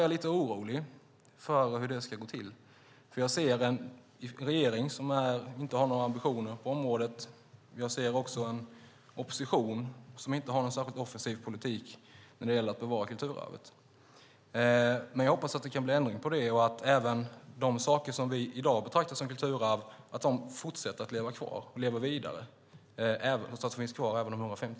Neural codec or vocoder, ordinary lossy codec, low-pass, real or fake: none; none; none; real